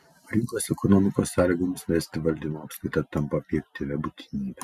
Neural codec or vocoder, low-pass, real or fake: none; 14.4 kHz; real